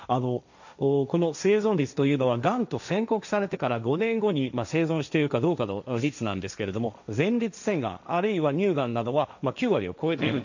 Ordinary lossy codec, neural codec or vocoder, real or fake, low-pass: none; codec, 16 kHz, 1.1 kbps, Voila-Tokenizer; fake; 7.2 kHz